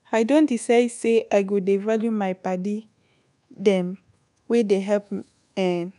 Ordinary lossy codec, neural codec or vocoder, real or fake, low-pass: none; codec, 24 kHz, 1.2 kbps, DualCodec; fake; 10.8 kHz